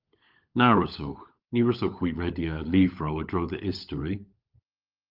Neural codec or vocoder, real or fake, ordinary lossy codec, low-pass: codec, 16 kHz, 16 kbps, FunCodec, trained on LibriTTS, 50 frames a second; fake; Opus, 24 kbps; 5.4 kHz